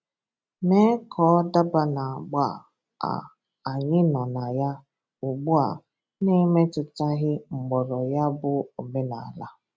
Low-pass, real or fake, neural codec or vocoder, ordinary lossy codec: none; real; none; none